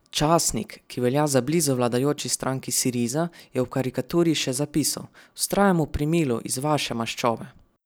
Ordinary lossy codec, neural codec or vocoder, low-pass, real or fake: none; none; none; real